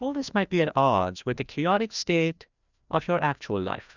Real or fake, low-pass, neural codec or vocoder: fake; 7.2 kHz; codec, 16 kHz, 1 kbps, FreqCodec, larger model